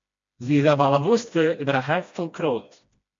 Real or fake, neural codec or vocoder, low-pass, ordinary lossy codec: fake; codec, 16 kHz, 1 kbps, FreqCodec, smaller model; 7.2 kHz; MP3, 48 kbps